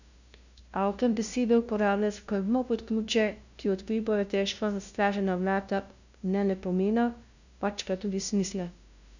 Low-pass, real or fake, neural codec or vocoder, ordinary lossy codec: 7.2 kHz; fake; codec, 16 kHz, 0.5 kbps, FunCodec, trained on LibriTTS, 25 frames a second; none